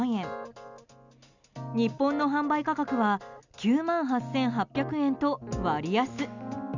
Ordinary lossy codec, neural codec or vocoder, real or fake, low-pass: none; none; real; 7.2 kHz